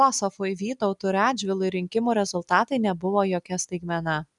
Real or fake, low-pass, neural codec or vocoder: real; 10.8 kHz; none